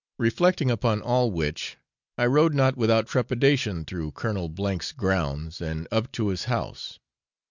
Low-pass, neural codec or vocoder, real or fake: 7.2 kHz; none; real